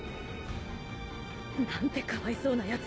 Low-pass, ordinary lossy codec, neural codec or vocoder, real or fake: none; none; none; real